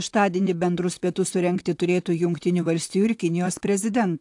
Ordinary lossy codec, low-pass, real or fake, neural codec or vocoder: AAC, 64 kbps; 10.8 kHz; fake; vocoder, 44.1 kHz, 128 mel bands, Pupu-Vocoder